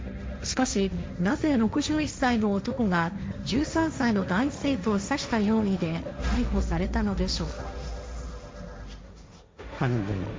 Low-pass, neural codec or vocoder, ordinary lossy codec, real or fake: none; codec, 16 kHz, 1.1 kbps, Voila-Tokenizer; none; fake